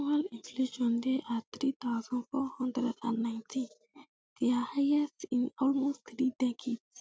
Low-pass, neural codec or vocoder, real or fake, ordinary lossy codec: none; none; real; none